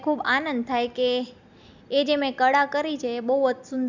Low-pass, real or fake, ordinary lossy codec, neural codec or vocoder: 7.2 kHz; real; none; none